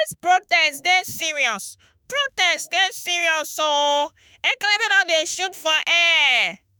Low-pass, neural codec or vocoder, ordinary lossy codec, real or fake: none; autoencoder, 48 kHz, 32 numbers a frame, DAC-VAE, trained on Japanese speech; none; fake